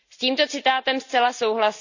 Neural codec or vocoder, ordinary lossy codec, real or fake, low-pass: none; none; real; 7.2 kHz